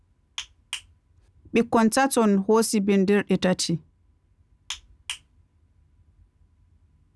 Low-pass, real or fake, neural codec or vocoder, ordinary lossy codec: none; real; none; none